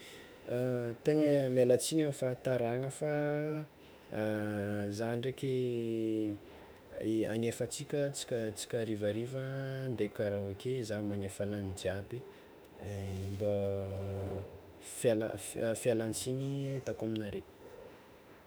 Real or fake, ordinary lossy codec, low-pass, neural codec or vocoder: fake; none; none; autoencoder, 48 kHz, 32 numbers a frame, DAC-VAE, trained on Japanese speech